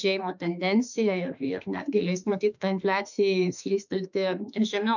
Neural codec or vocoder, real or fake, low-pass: autoencoder, 48 kHz, 32 numbers a frame, DAC-VAE, trained on Japanese speech; fake; 7.2 kHz